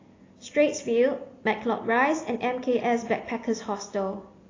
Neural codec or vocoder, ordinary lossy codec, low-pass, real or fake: none; AAC, 32 kbps; 7.2 kHz; real